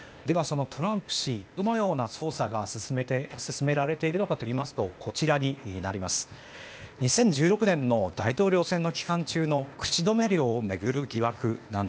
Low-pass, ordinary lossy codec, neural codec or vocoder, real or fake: none; none; codec, 16 kHz, 0.8 kbps, ZipCodec; fake